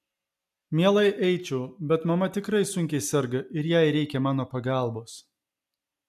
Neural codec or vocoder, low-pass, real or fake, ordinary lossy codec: none; 14.4 kHz; real; MP3, 96 kbps